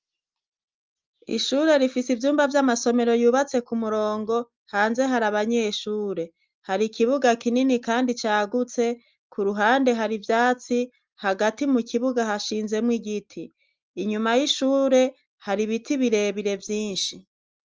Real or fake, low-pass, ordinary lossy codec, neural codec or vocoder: real; 7.2 kHz; Opus, 24 kbps; none